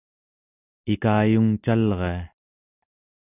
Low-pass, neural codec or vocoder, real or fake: 3.6 kHz; none; real